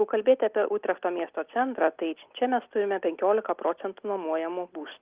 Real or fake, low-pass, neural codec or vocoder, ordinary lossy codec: real; 3.6 kHz; none; Opus, 24 kbps